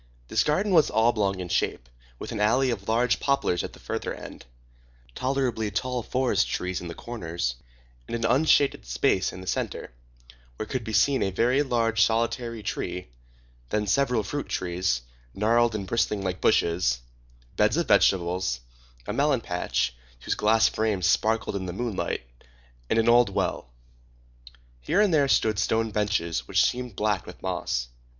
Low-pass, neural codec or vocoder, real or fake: 7.2 kHz; none; real